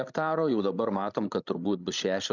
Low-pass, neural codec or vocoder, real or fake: 7.2 kHz; codec, 16 kHz, 16 kbps, FreqCodec, larger model; fake